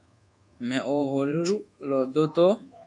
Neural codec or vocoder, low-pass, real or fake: codec, 24 kHz, 1.2 kbps, DualCodec; 10.8 kHz; fake